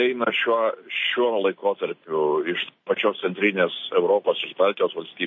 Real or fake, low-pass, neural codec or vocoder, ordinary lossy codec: real; 7.2 kHz; none; MP3, 32 kbps